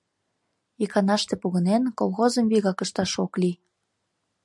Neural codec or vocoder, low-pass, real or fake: none; 10.8 kHz; real